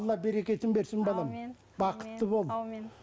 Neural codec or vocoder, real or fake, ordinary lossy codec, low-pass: none; real; none; none